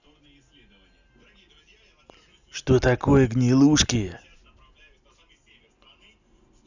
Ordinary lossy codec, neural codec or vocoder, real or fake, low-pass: none; none; real; 7.2 kHz